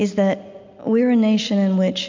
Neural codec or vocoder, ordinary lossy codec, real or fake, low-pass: none; MP3, 64 kbps; real; 7.2 kHz